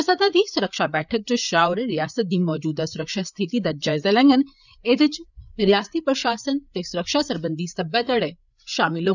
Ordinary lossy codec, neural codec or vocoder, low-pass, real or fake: none; codec, 16 kHz, 8 kbps, FreqCodec, larger model; 7.2 kHz; fake